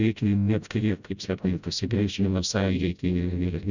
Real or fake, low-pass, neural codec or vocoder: fake; 7.2 kHz; codec, 16 kHz, 0.5 kbps, FreqCodec, smaller model